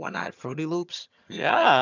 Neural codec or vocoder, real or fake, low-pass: vocoder, 22.05 kHz, 80 mel bands, HiFi-GAN; fake; 7.2 kHz